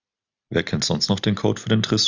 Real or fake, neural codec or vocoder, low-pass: real; none; 7.2 kHz